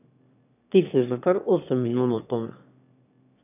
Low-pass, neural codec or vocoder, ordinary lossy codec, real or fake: 3.6 kHz; autoencoder, 22.05 kHz, a latent of 192 numbers a frame, VITS, trained on one speaker; none; fake